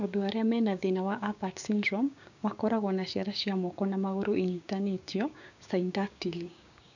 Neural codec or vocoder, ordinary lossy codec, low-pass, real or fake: codec, 16 kHz, 6 kbps, DAC; none; 7.2 kHz; fake